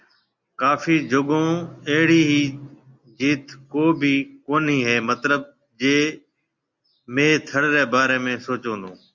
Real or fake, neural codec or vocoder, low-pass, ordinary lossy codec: real; none; 7.2 kHz; Opus, 64 kbps